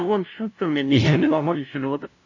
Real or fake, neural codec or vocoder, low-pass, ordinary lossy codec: fake; codec, 16 kHz, 0.5 kbps, FunCodec, trained on LibriTTS, 25 frames a second; 7.2 kHz; none